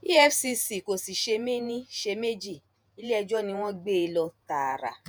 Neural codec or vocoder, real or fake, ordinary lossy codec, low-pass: vocoder, 48 kHz, 128 mel bands, Vocos; fake; none; none